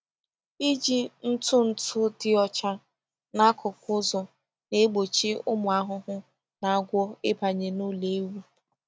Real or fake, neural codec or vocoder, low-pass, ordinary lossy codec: real; none; none; none